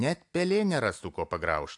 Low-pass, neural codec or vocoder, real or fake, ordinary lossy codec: 10.8 kHz; none; real; AAC, 64 kbps